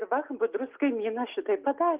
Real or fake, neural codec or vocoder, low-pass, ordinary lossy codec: real; none; 3.6 kHz; Opus, 32 kbps